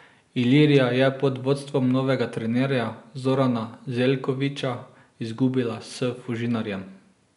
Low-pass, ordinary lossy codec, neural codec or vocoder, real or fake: 10.8 kHz; none; none; real